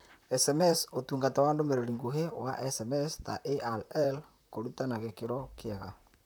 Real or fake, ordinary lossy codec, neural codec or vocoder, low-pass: fake; none; vocoder, 44.1 kHz, 128 mel bands, Pupu-Vocoder; none